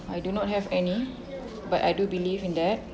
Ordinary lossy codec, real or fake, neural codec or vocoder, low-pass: none; real; none; none